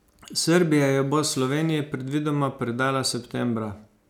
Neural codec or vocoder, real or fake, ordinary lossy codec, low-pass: none; real; none; 19.8 kHz